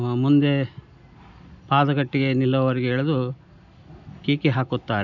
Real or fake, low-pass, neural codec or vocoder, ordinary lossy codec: real; 7.2 kHz; none; none